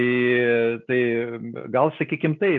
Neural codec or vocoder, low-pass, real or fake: none; 7.2 kHz; real